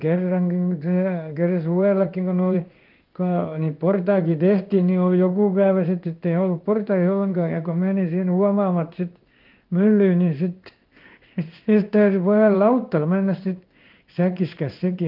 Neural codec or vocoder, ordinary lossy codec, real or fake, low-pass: codec, 16 kHz in and 24 kHz out, 1 kbps, XY-Tokenizer; Opus, 24 kbps; fake; 5.4 kHz